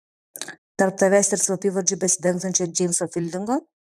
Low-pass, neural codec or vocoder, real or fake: 14.4 kHz; vocoder, 44.1 kHz, 128 mel bands, Pupu-Vocoder; fake